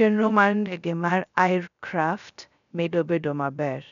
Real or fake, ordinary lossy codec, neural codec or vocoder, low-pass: fake; none; codec, 16 kHz, 0.3 kbps, FocalCodec; 7.2 kHz